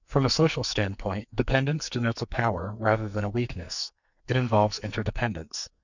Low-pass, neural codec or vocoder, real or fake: 7.2 kHz; codec, 44.1 kHz, 2.6 kbps, SNAC; fake